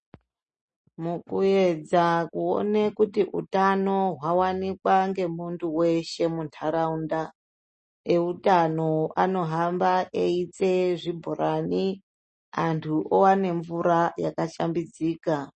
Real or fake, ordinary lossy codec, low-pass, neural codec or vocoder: real; MP3, 32 kbps; 10.8 kHz; none